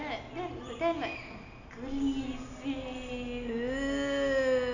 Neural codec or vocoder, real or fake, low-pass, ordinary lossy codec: none; real; 7.2 kHz; none